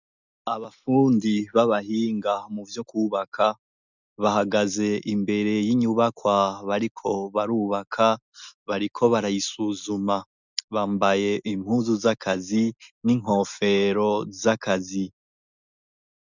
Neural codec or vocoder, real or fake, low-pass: none; real; 7.2 kHz